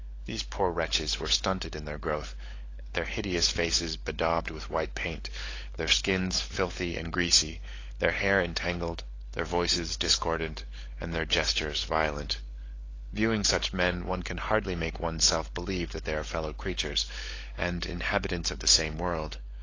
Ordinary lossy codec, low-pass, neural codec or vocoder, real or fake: AAC, 32 kbps; 7.2 kHz; codec, 16 kHz, 16 kbps, FunCodec, trained on LibriTTS, 50 frames a second; fake